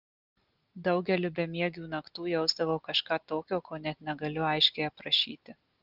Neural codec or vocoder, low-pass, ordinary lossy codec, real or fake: none; 5.4 kHz; Opus, 32 kbps; real